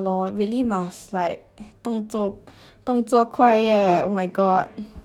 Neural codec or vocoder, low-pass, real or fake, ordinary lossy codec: codec, 44.1 kHz, 2.6 kbps, DAC; 19.8 kHz; fake; none